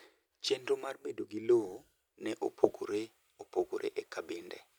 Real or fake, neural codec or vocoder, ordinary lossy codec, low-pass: real; none; none; none